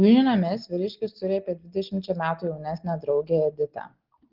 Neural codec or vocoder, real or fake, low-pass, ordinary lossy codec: none; real; 5.4 kHz; Opus, 16 kbps